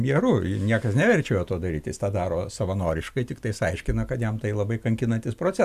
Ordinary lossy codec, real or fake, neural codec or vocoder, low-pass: AAC, 96 kbps; real; none; 14.4 kHz